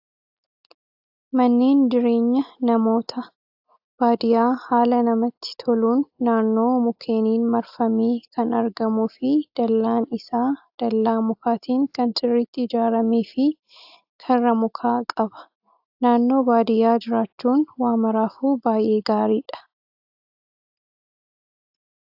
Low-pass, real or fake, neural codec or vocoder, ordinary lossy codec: 5.4 kHz; real; none; AAC, 48 kbps